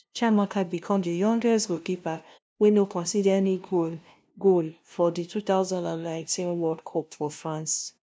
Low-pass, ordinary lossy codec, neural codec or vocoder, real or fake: none; none; codec, 16 kHz, 0.5 kbps, FunCodec, trained on LibriTTS, 25 frames a second; fake